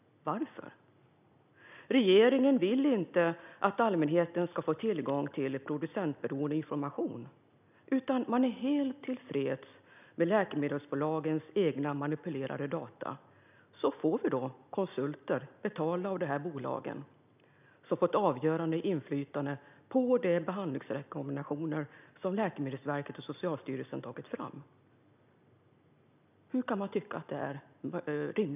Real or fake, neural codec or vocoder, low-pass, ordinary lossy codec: real; none; 3.6 kHz; none